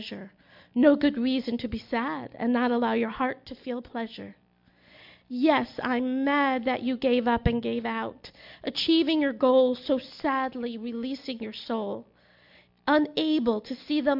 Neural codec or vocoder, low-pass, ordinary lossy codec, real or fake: none; 5.4 kHz; MP3, 48 kbps; real